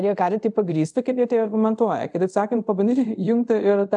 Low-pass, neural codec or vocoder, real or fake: 10.8 kHz; codec, 24 kHz, 0.5 kbps, DualCodec; fake